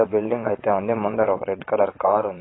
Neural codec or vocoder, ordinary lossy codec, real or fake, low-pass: vocoder, 22.05 kHz, 80 mel bands, Vocos; AAC, 16 kbps; fake; 7.2 kHz